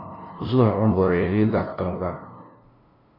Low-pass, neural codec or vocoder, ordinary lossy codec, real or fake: 5.4 kHz; codec, 16 kHz, 0.5 kbps, FunCodec, trained on LibriTTS, 25 frames a second; AAC, 24 kbps; fake